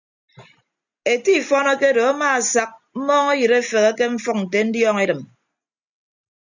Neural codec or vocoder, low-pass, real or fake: none; 7.2 kHz; real